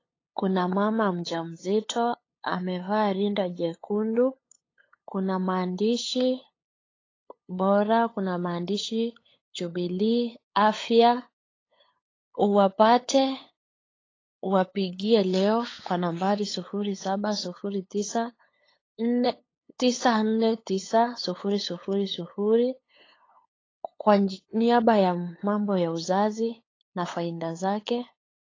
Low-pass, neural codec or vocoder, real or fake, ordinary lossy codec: 7.2 kHz; codec, 16 kHz, 8 kbps, FunCodec, trained on LibriTTS, 25 frames a second; fake; AAC, 32 kbps